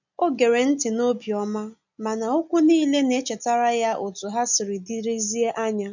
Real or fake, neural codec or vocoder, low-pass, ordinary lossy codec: real; none; 7.2 kHz; none